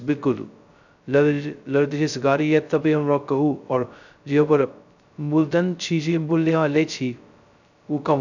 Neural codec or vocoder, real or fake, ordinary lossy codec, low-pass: codec, 16 kHz, 0.2 kbps, FocalCodec; fake; none; 7.2 kHz